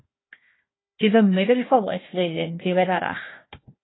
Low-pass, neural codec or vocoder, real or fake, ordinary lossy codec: 7.2 kHz; codec, 16 kHz, 1 kbps, FunCodec, trained on Chinese and English, 50 frames a second; fake; AAC, 16 kbps